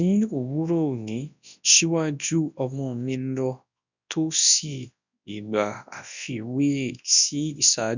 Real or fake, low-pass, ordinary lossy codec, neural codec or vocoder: fake; 7.2 kHz; none; codec, 24 kHz, 0.9 kbps, WavTokenizer, large speech release